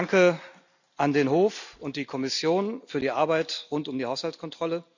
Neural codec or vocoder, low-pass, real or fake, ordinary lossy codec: none; 7.2 kHz; real; none